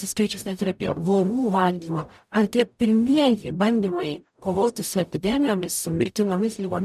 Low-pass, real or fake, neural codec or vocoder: 14.4 kHz; fake; codec, 44.1 kHz, 0.9 kbps, DAC